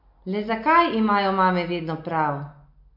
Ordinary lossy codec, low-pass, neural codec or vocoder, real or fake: none; 5.4 kHz; none; real